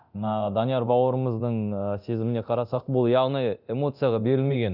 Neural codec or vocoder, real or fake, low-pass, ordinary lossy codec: codec, 24 kHz, 0.9 kbps, DualCodec; fake; 5.4 kHz; none